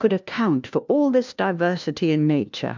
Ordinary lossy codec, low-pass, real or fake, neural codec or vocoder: MP3, 64 kbps; 7.2 kHz; fake; codec, 16 kHz, 1 kbps, FunCodec, trained on LibriTTS, 50 frames a second